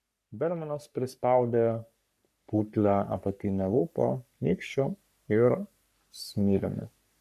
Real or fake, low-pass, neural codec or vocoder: fake; 14.4 kHz; codec, 44.1 kHz, 3.4 kbps, Pupu-Codec